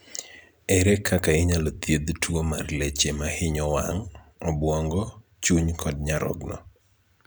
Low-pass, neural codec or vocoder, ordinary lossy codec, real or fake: none; vocoder, 44.1 kHz, 128 mel bands every 256 samples, BigVGAN v2; none; fake